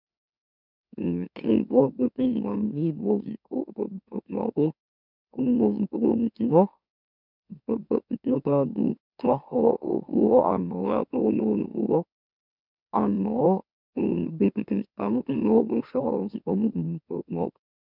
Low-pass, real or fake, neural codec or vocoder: 5.4 kHz; fake; autoencoder, 44.1 kHz, a latent of 192 numbers a frame, MeloTTS